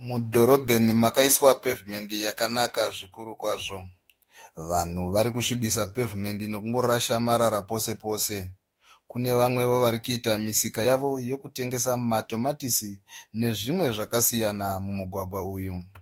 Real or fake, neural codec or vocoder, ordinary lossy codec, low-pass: fake; autoencoder, 48 kHz, 32 numbers a frame, DAC-VAE, trained on Japanese speech; AAC, 48 kbps; 19.8 kHz